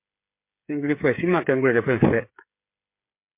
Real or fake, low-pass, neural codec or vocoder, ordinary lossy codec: fake; 3.6 kHz; codec, 16 kHz, 8 kbps, FreqCodec, smaller model; MP3, 24 kbps